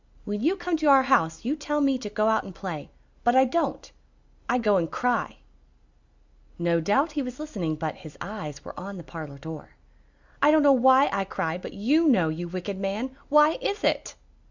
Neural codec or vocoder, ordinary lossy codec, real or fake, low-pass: none; Opus, 64 kbps; real; 7.2 kHz